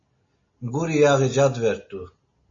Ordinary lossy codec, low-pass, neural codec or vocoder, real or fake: MP3, 32 kbps; 7.2 kHz; none; real